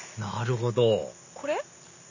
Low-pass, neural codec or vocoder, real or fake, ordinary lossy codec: 7.2 kHz; none; real; none